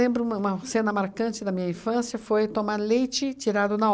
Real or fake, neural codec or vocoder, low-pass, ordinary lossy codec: real; none; none; none